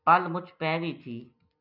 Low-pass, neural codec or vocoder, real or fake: 5.4 kHz; none; real